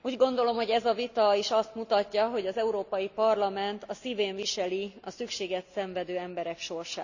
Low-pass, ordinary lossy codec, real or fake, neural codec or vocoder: 7.2 kHz; none; real; none